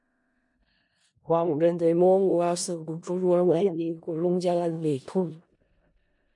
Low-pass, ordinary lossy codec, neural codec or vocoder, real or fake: 10.8 kHz; MP3, 48 kbps; codec, 16 kHz in and 24 kHz out, 0.4 kbps, LongCat-Audio-Codec, four codebook decoder; fake